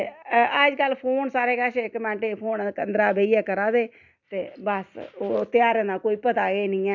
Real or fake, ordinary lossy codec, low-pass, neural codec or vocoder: real; none; 7.2 kHz; none